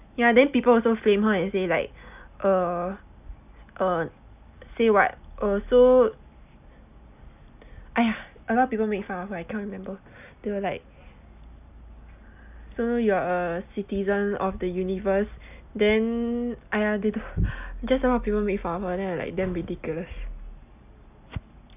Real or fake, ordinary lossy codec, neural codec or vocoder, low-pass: real; none; none; 3.6 kHz